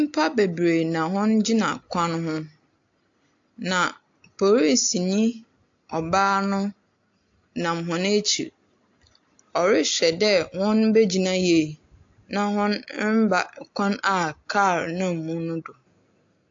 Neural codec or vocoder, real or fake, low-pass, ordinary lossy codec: none; real; 7.2 kHz; AAC, 48 kbps